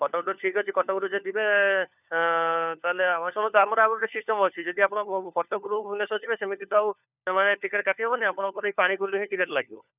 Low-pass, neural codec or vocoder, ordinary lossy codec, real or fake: 3.6 kHz; codec, 16 kHz, 4 kbps, FunCodec, trained on LibriTTS, 50 frames a second; none; fake